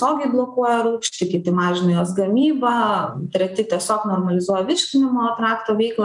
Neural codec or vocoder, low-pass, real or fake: none; 10.8 kHz; real